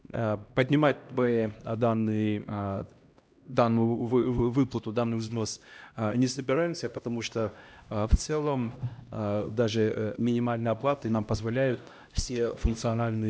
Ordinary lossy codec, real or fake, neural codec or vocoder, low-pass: none; fake; codec, 16 kHz, 1 kbps, X-Codec, HuBERT features, trained on LibriSpeech; none